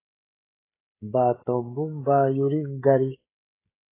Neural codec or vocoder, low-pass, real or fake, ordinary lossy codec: codec, 16 kHz, 16 kbps, FreqCodec, smaller model; 3.6 kHz; fake; AAC, 24 kbps